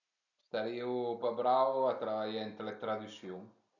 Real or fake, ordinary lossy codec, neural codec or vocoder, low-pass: real; none; none; 7.2 kHz